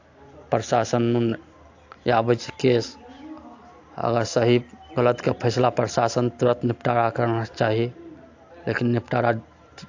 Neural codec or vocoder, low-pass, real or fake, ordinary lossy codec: none; 7.2 kHz; real; AAC, 48 kbps